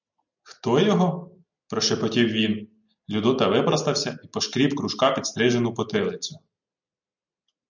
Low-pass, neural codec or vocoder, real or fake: 7.2 kHz; none; real